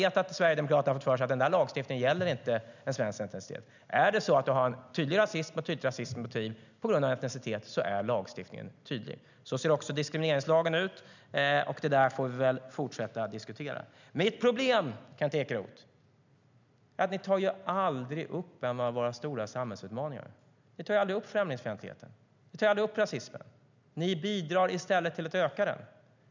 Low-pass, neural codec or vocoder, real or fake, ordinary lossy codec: 7.2 kHz; none; real; none